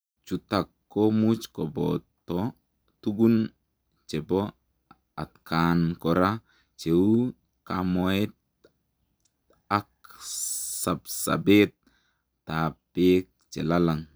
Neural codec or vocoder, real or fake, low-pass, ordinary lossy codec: none; real; none; none